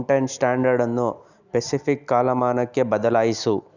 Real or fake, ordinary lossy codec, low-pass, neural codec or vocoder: real; none; 7.2 kHz; none